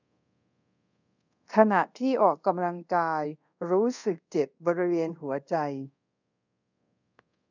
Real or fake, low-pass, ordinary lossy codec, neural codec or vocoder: fake; 7.2 kHz; none; codec, 24 kHz, 0.5 kbps, DualCodec